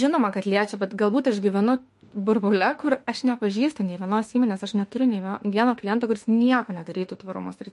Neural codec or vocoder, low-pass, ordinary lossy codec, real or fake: autoencoder, 48 kHz, 32 numbers a frame, DAC-VAE, trained on Japanese speech; 14.4 kHz; MP3, 48 kbps; fake